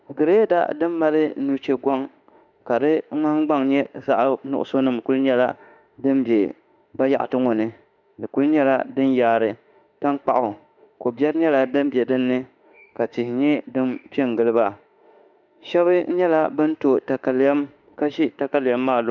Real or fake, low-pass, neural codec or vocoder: fake; 7.2 kHz; autoencoder, 48 kHz, 32 numbers a frame, DAC-VAE, trained on Japanese speech